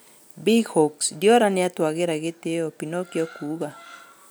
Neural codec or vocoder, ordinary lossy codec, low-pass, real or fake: none; none; none; real